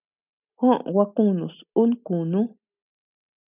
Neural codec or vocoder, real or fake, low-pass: none; real; 3.6 kHz